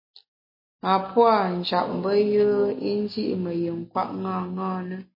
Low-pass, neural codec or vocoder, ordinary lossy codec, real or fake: 5.4 kHz; none; MP3, 24 kbps; real